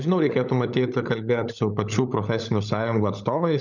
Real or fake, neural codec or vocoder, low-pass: fake; codec, 16 kHz, 16 kbps, FunCodec, trained on LibriTTS, 50 frames a second; 7.2 kHz